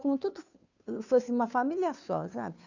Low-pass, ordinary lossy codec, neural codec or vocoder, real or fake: 7.2 kHz; none; codec, 16 kHz, 2 kbps, FunCodec, trained on Chinese and English, 25 frames a second; fake